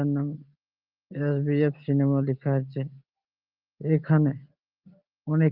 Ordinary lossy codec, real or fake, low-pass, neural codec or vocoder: Opus, 32 kbps; real; 5.4 kHz; none